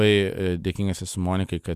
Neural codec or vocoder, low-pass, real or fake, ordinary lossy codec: none; 14.4 kHz; real; Opus, 64 kbps